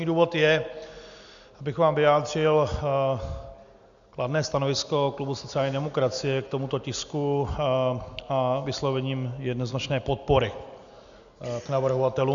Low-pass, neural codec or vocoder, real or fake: 7.2 kHz; none; real